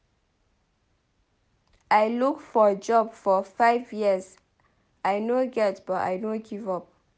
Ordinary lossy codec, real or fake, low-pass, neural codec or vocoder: none; real; none; none